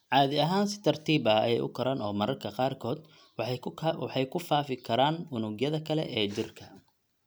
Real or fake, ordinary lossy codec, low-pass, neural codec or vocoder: real; none; none; none